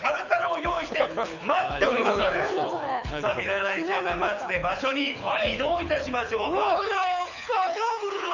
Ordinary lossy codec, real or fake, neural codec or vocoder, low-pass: none; fake; codec, 24 kHz, 6 kbps, HILCodec; 7.2 kHz